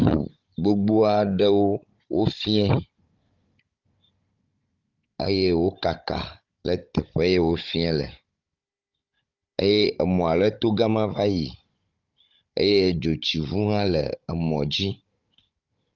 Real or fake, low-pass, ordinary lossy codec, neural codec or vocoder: fake; 7.2 kHz; Opus, 24 kbps; codec, 16 kHz, 16 kbps, FunCodec, trained on Chinese and English, 50 frames a second